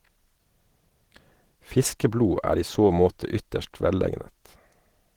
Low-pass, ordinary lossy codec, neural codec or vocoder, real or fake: 19.8 kHz; Opus, 16 kbps; none; real